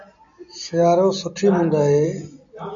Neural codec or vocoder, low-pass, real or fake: none; 7.2 kHz; real